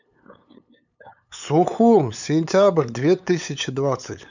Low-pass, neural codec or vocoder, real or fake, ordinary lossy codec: 7.2 kHz; codec, 16 kHz, 8 kbps, FunCodec, trained on LibriTTS, 25 frames a second; fake; none